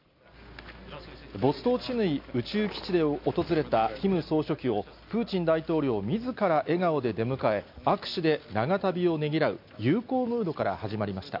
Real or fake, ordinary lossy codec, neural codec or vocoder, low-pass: real; MP3, 48 kbps; none; 5.4 kHz